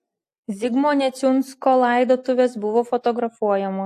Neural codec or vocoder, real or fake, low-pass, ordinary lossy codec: none; real; 14.4 kHz; AAC, 64 kbps